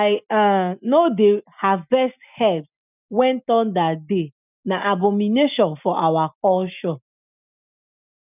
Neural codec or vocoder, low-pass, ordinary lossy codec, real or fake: none; 3.6 kHz; none; real